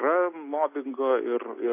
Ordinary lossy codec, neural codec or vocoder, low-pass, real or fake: AAC, 24 kbps; none; 3.6 kHz; real